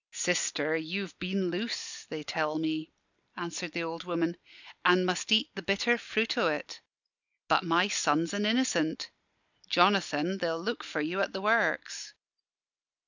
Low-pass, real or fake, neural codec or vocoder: 7.2 kHz; real; none